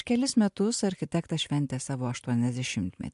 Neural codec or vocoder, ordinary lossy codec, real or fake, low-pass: none; MP3, 64 kbps; real; 10.8 kHz